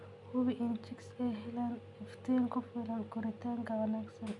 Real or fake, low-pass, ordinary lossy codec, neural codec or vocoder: real; 10.8 kHz; none; none